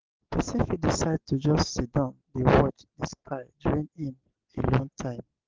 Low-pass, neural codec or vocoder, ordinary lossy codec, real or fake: 7.2 kHz; none; Opus, 16 kbps; real